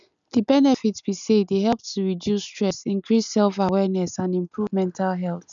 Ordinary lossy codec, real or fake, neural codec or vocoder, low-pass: none; real; none; 7.2 kHz